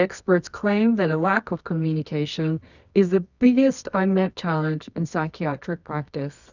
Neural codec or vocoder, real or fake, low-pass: codec, 24 kHz, 0.9 kbps, WavTokenizer, medium music audio release; fake; 7.2 kHz